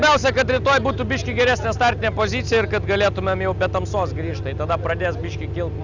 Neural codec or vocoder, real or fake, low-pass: none; real; 7.2 kHz